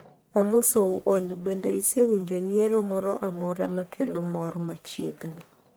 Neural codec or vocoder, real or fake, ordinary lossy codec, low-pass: codec, 44.1 kHz, 1.7 kbps, Pupu-Codec; fake; none; none